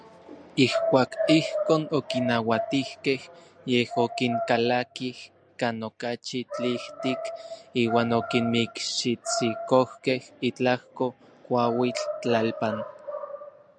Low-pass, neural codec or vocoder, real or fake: 9.9 kHz; none; real